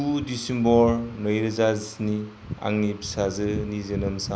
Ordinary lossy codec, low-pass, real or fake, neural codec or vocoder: none; none; real; none